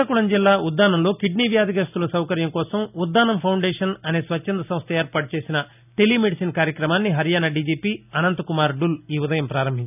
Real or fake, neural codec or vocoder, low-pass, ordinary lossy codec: real; none; 3.6 kHz; none